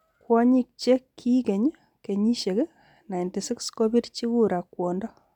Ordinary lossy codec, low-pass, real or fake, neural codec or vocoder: none; 19.8 kHz; real; none